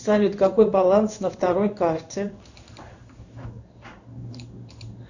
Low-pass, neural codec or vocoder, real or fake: 7.2 kHz; codec, 16 kHz in and 24 kHz out, 1 kbps, XY-Tokenizer; fake